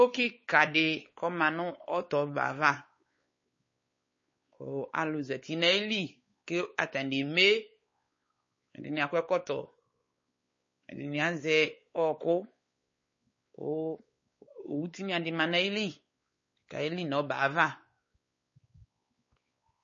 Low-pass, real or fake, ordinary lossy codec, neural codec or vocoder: 7.2 kHz; fake; MP3, 32 kbps; codec, 16 kHz, 4 kbps, X-Codec, WavLM features, trained on Multilingual LibriSpeech